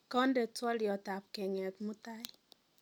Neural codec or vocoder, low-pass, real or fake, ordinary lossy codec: none; 19.8 kHz; real; none